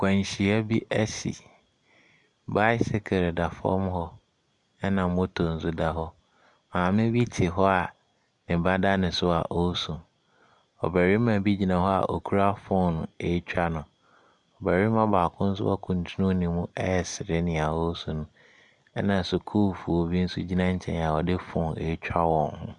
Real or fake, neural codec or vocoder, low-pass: real; none; 10.8 kHz